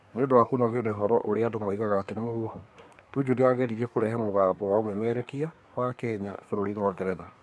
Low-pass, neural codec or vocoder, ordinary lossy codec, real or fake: none; codec, 24 kHz, 1 kbps, SNAC; none; fake